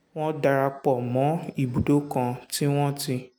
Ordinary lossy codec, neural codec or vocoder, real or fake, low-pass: none; none; real; none